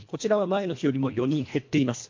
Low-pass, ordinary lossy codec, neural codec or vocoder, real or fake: 7.2 kHz; MP3, 48 kbps; codec, 24 kHz, 1.5 kbps, HILCodec; fake